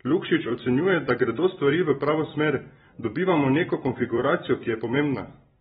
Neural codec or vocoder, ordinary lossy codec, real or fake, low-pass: vocoder, 44.1 kHz, 128 mel bands every 256 samples, BigVGAN v2; AAC, 16 kbps; fake; 19.8 kHz